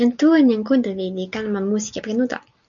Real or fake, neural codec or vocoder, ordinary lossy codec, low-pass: real; none; MP3, 64 kbps; 7.2 kHz